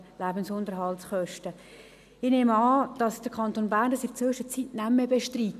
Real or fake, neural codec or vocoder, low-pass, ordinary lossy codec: real; none; 14.4 kHz; none